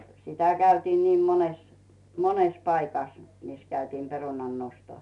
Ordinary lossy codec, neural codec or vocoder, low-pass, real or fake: none; none; 10.8 kHz; real